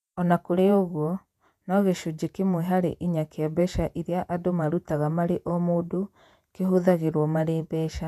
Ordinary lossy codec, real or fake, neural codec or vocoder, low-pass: none; fake; vocoder, 48 kHz, 128 mel bands, Vocos; 14.4 kHz